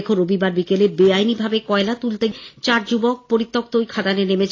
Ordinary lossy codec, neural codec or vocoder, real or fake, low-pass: AAC, 32 kbps; none; real; 7.2 kHz